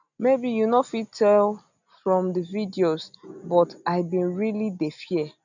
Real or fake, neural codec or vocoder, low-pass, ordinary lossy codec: real; none; 7.2 kHz; none